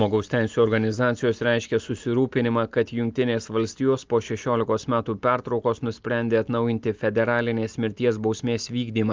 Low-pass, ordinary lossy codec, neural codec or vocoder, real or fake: 7.2 kHz; Opus, 32 kbps; none; real